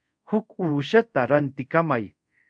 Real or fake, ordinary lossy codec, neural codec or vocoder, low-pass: fake; MP3, 64 kbps; codec, 24 kHz, 0.5 kbps, DualCodec; 9.9 kHz